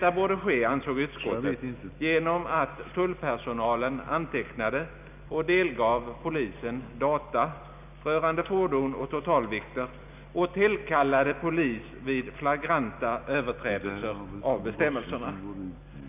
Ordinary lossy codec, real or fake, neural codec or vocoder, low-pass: none; real; none; 3.6 kHz